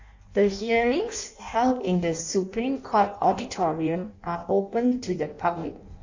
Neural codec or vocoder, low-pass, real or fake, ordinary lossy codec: codec, 16 kHz in and 24 kHz out, 0.6 kbps, FireRedTTS-2 codec; 7.2 kHz; fake; AAC, 48 kbps